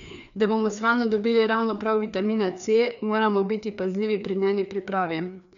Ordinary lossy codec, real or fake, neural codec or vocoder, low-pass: none; fake; codec, 16 kHz, 2 kbps, FreqCodec, larger model; 7.2 kHz